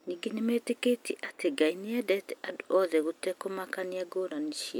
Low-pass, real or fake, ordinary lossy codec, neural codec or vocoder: none; real; none; none